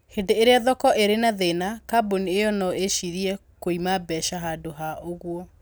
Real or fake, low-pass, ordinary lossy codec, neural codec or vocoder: real; none; none; none